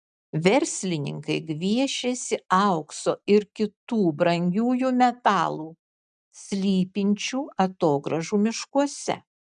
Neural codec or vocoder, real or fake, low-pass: none; real; 9.9 kHz